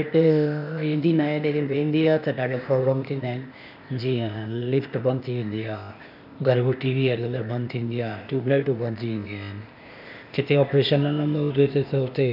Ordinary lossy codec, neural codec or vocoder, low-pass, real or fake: none; codec, 16 kHz, 0.8 kbps, ZipCodec; 5.4 kHz; fake